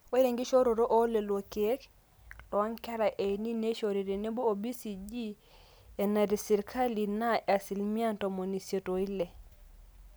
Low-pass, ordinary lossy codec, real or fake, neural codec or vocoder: none; none; real; none